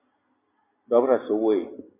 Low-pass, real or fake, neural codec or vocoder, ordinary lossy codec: 3.6 kHz; real; none; MP3, 32 kbps